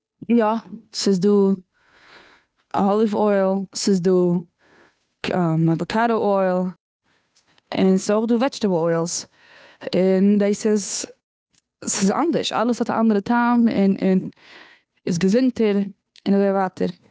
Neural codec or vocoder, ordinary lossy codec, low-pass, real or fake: codec, 16 kHz, 2 kbps, FunCodec, trained on Chinese and English, 25 frames a second; none; none; fake